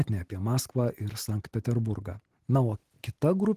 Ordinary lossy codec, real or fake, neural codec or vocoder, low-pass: Opus, 16 kbps; real; none; 14.4 kHz